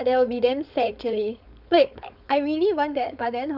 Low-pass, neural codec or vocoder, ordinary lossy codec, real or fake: 5.4 kHz; codec, 16 kHz, 4.8 kbps, FACodec; none; fake